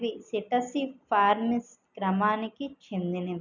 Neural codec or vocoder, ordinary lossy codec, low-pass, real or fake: none; none; 7.2 kHz; real